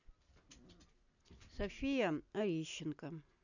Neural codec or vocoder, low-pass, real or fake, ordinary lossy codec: none; 7.2 kHz; real; none